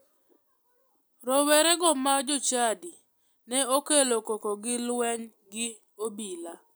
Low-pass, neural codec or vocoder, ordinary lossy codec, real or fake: none; none; none; real